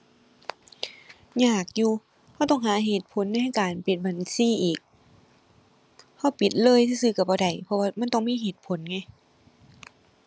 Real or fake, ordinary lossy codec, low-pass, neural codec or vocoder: real; none; none; none